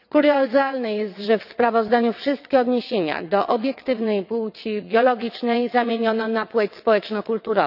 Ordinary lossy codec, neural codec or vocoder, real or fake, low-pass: none; vocoder, 22.05 kHz, 80 mel bands, WaveNeXt; fake; 5.4 kHz